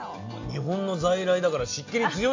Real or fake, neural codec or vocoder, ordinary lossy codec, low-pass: real; none; AAC, 48 kbps; 7.2 kHz